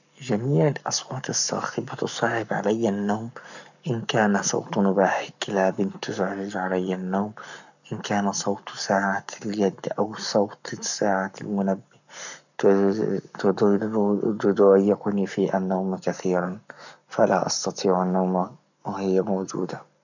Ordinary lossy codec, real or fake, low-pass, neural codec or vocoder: none; fake; 7.2 kHz; codec, 44.1 kHz, 7.8 kbps, Pupu-Codec